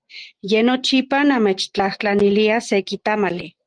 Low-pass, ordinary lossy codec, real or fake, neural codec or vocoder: 9.9 kHz; Opus, 24 kbps; real; none